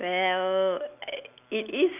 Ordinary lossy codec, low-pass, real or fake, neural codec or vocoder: none; 3.6 kHz; real; none